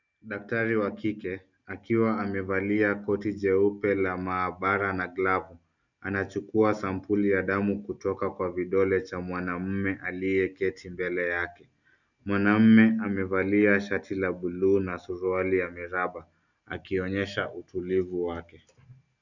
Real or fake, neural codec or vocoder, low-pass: real; none; 7.2 kHz